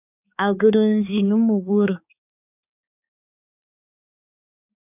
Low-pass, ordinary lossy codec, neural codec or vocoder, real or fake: 3.6 kHz; AAC, 32 kbps; codec, 16 kHz, 4 kbps, X-Codec, HuBERT features, trained on balanced general audio; fake